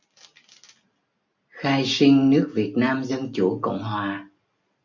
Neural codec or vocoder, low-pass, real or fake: none; 7.2 kHz; real